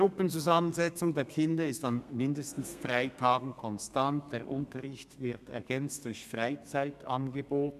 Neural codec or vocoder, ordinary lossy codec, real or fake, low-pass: codec, 32 kHz, 1.9 kbps, SNAC; none; fake; 14.4 kHz